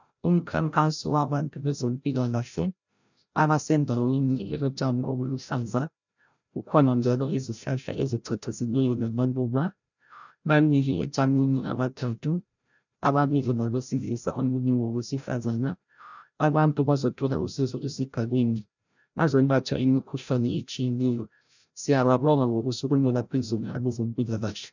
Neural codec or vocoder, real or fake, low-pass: codec, 16 kHz, 0.5 kbps, FreqCodec, larger model; fake; 7.2 kHz